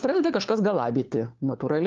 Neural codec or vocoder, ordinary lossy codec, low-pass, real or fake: codec, 16 kHz, 4 kbps, FunCodec, trained on LibriTTS, 50 frames a second; Opus, 32 kbps; 7.2 kHz; fake